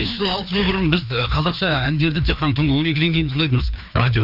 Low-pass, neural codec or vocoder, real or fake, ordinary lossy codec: 5.4 kHz; codec, 16 kHz, 2 kbps, FreqCodec, larger model; fake; none